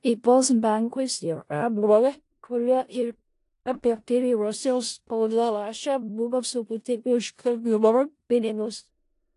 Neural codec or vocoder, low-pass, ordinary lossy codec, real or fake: codec, 16 kHz in and 24 kHz out, 0.4 kbps, LongCat-Audio-Codec, four codebook decoder; 10.8 kHz; AAC, 48 kbps; fake